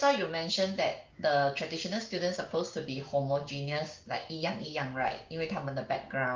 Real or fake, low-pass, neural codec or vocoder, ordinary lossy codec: fake; 7.2 kHz; codec, 16 kHz, 6 kbps, DAC; Opus, 24 kbps